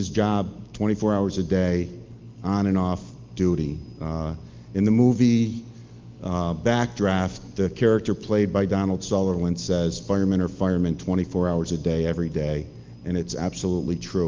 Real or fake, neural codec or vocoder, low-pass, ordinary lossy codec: real; none; 7.2 kHz; Opus, 32 kbps